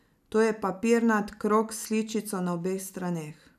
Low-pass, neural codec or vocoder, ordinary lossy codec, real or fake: 14.4 kHz; none; none; real